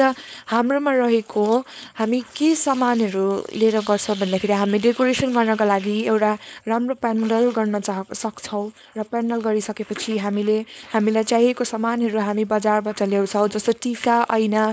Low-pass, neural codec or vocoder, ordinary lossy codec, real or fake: none; codec, 16 kHz, 4.8 kbps, FACodec; none; fake